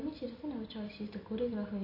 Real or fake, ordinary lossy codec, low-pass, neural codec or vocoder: real; AAC, 48 kbps; 5.4 kHz; none